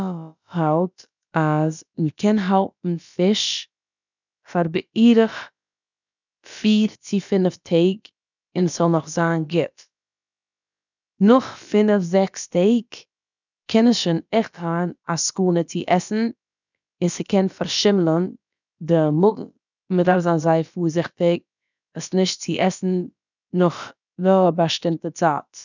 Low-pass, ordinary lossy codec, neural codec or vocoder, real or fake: 7.2 kHz; none; codec, 16 kHz, about 1 kbps, DyCAST, with the encoder's durations; fake